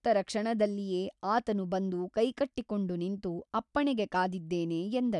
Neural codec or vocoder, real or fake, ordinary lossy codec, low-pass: none; real; none; 10.8 kHz